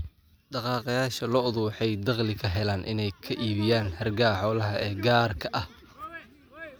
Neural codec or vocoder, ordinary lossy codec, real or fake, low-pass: none; none; real; none